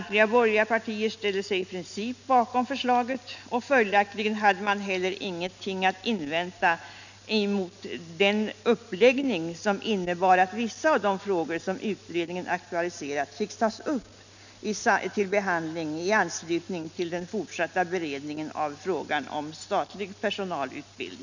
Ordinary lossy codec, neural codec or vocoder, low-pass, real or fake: none; none; 7.2 kHz; real